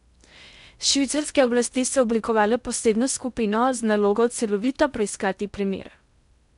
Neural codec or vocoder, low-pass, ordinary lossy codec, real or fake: codec, 16 kHz in and 24 kHz out, 0.6 kbps, FocalCodec, streaming, 2048 codes; 10.8 kHz; none; fake